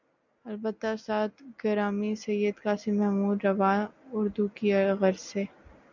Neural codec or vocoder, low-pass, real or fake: none; 7.2 kHz; real